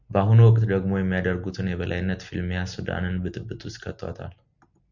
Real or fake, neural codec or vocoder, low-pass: real; none; 7.2 kHz